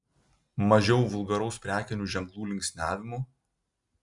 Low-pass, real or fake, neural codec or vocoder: 10.8 kHz; real; none